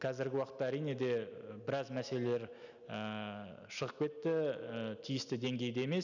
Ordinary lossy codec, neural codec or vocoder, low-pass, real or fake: none; none; 7.2 kHz; real